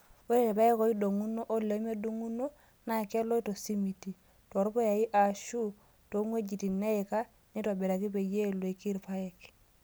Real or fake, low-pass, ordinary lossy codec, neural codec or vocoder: real; none; none; none